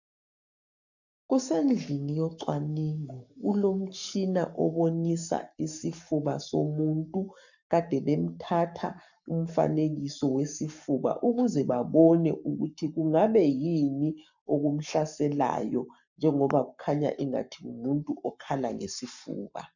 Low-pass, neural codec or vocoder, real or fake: 7.2 kHz; codec, 44.1 kHz, 7.8 kbps, Pupu-Codec; fake